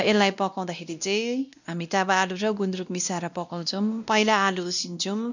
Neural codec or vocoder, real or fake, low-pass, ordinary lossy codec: codec, 16 kHz, 1 kbps, X-Codec, WavLM features, trained on Multilingual LibriSpeech; fake; 7.2 kHz; none